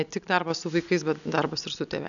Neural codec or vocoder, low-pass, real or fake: none; 7.2 kHz; real